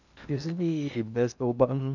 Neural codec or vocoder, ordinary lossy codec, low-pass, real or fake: codec, 16 kHz in and 24 kHz out, 0.8 kbps, FocalCodec, streaming, 65536 codes; none; 7.2 kHz; fake